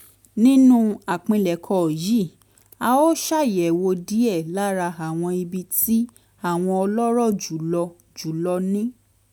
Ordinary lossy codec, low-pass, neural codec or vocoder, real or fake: none; 19.8 kHz; none; real